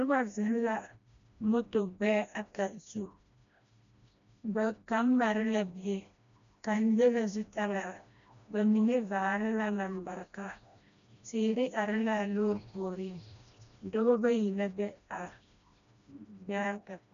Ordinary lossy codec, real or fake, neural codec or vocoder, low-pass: MP3, 64 kbps; fake; codec, 16 kHz, 1 kbps, FreqCodec, smaller model; 7.2 kHz